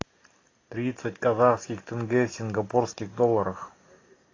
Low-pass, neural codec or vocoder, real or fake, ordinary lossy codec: 7.2 kHz; none; real; AAC, 32 kbps